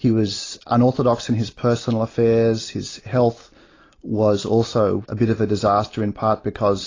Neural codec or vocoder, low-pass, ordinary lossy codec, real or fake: none; 7.2 kHz; AAC, 32 kbps; real